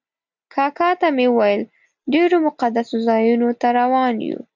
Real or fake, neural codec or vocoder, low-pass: real; none; 7.2 kHz